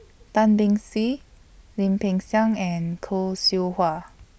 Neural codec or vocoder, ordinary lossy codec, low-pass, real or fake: none; none; none; real